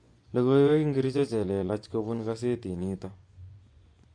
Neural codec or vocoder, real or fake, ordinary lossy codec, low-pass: vocoder, 24 kHz, 100 mel bands, Vocos; fake; MP3, 48 kbps; 9.9 kHz